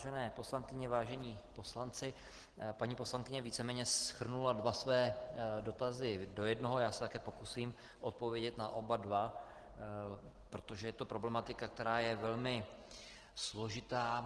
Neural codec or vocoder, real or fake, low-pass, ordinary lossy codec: none; real; 10.8 kHz; Opus, 16 kbps